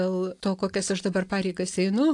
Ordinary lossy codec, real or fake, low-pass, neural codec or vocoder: AAC, 64 kbps; real; 10.8 kHz; none